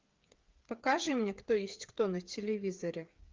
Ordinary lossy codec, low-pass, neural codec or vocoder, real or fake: Opus, 32 kbps; 7.2 kHz; codec, 16 kHz, 8 kbps, FreqCodec, smaller model; fake